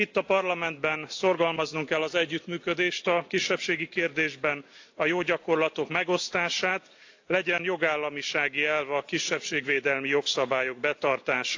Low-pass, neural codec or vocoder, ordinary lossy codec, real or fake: 7.2 kHz; none; AAC, 48 kbps; real